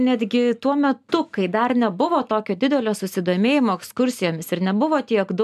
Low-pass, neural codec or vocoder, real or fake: 14.4 kHz; none; real